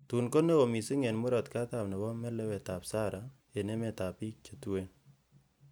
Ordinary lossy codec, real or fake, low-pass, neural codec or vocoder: none; real; none; none